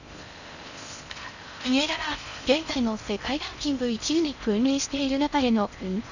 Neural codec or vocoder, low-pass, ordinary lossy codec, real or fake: codec, 16 kHz in and 24 kHz out, 0.6 kbps, FocalCodec, streaming, 4096 codes; 7.2 kHz; none; fake